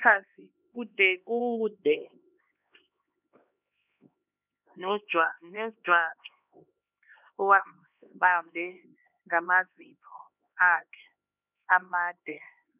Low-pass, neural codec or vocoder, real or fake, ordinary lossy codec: 3.6 kHz; codec, 16 kHz, 2 kbps, X-Codec, HuBERT features, trained on LibriSpeech; fake; none